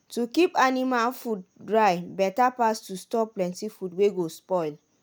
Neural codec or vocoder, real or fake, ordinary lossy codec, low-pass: none; real; none; none